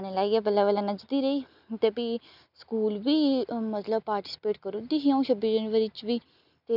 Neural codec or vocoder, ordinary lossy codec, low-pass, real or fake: none; none; 5.4 kHz; real